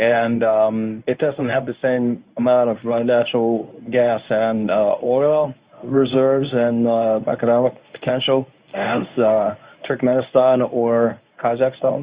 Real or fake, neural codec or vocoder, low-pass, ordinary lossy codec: fake; codec, 24 kHz, 0.9 kbps, WavTokenizer, medium speech release version 1; 3.6 kHz; Opus, 64 kbps